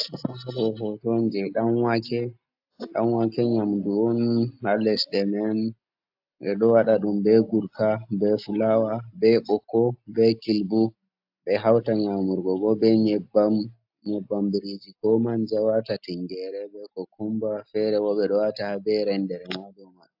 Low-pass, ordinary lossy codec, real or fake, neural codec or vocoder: 5.4 kHz; AAC, 48 kbps; real; none